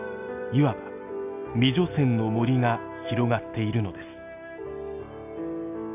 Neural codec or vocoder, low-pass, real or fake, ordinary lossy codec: none; 3.6 kHz; real; AAC, 32 kbps